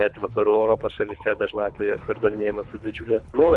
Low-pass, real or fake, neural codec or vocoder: 10.8 kHz; fake; codec, 24 kHz, 3 kbps, HILCodec